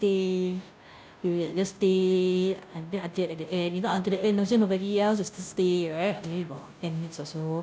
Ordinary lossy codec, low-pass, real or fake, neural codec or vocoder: none; none; fake; codec, 16 kHz, 0.5 kbps, FunCodec, trained on Chinese and English, 25 frames a second